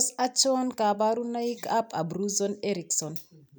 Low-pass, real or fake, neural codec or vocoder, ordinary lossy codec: none; real; none; none